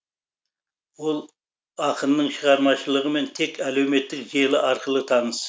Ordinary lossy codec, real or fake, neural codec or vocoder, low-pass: none; real; none; none